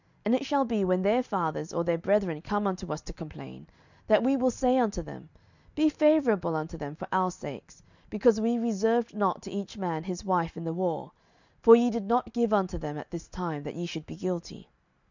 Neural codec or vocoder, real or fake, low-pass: none; real; 7.2 kHz